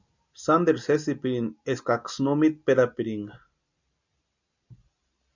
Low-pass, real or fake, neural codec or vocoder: 7.2 kHz; real; none